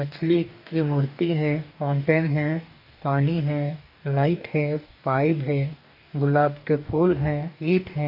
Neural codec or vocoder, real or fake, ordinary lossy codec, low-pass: codec, 44.1 kHz, 2.6 kbps, DAC; fake; none; 5.4 kHz